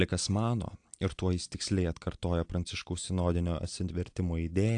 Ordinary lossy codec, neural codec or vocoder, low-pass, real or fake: AAC, 64 kbps; none; 9.9 kHz; real